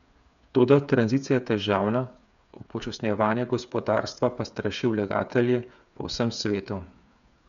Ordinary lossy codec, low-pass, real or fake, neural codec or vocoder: none; 7.2 kHz; fake; codec, 16 kHz, 8 kbps, FreqCodec, smaller model